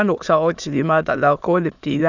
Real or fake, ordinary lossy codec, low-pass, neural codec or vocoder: fake; none; 7.2 kHz; autoencoder, 22.05 kHz, a latent of 192 numbers a frame, VITS, trained on many speakers